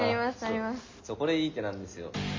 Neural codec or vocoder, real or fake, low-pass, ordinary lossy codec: none; real; 7.2 kHz; MP3, 48 kbps